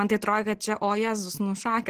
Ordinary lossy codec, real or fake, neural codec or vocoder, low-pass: Opus, 16 kbps; real; none; 14.4 kHz